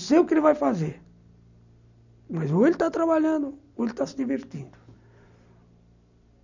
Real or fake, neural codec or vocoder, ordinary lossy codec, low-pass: real; none; none; 7.2 kHz